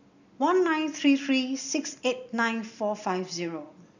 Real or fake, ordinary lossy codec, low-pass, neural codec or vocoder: real; none; 7.2 kHz; none